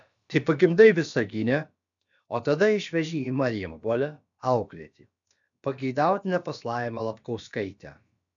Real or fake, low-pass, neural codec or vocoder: fake; 7.2 kHz; codec, 16 kHz, about 1 kbps, DyCAST, with the encoder's durations